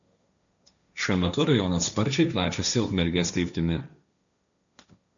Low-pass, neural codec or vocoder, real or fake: 7.2 kHz; codec, 16 kHz, 1.1 kbps, Voila-Tokenizer; fake